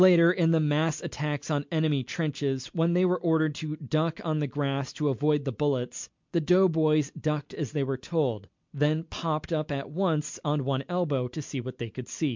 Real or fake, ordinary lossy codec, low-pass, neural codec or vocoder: real; MP3, 64 kbps; 7.2 kHz; none